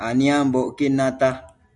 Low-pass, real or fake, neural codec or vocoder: 10.8 kHz; real; none